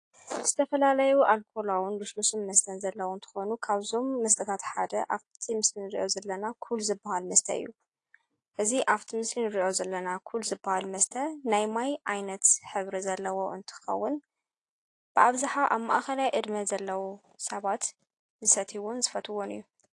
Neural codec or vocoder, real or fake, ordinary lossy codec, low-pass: none; real; AAC, 48 kbps; 10.8 kHz